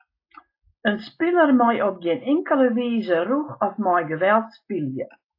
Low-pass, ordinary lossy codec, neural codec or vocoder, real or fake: 5.4 kHz; AAC, 32 kbps; none; real